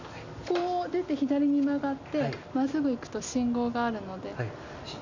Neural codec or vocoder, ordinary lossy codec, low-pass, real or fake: none; none; 7.2 kHz; real